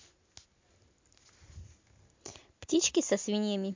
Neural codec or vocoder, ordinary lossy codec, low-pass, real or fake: none; MP3, 48 kbps; 7.2 kHz; real